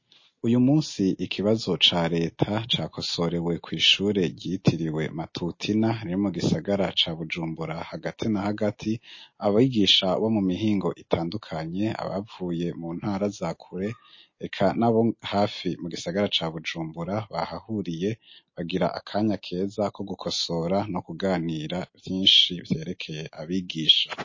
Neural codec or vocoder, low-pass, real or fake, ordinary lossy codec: none; 7.2 kHz; real; MP3, 32 kbps